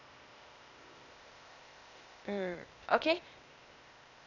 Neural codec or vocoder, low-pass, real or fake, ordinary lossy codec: codec, 16 kHz, 0.8 kbps, ZipCodec; 7.2 kHz; fake; none